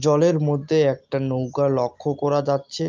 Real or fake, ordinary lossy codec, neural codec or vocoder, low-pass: real; Opus, 24 kbps; none; 7.2 kHz